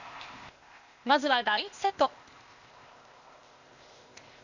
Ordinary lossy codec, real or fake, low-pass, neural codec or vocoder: Opus, 64 kbps; fake; 7.2 kHz; codec, 16 kHz, 0.8 kbps, ZipCodec